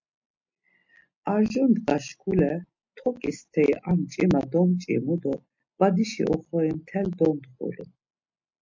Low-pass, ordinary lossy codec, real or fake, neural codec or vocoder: 7.2 kHz; MP3, 48 kbps; real; none